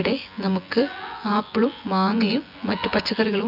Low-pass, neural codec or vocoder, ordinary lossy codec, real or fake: 5.4 kHz; vocoder, 24 kHz, 100 mel bands, Vocos; none; fake